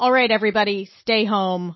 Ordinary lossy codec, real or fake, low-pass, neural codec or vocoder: MP3, 24 kbps; real; 7.2 kHz; none